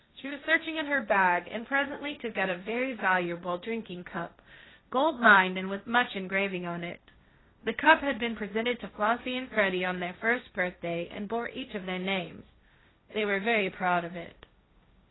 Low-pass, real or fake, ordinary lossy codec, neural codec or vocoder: 7.2 kHz; fake; AAC, 16 kbps; codec, 16 kHz, 1.1 kbps, Voila-Tokenizer